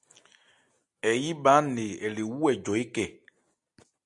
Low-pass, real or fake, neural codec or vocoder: 10.8 kHz; real; none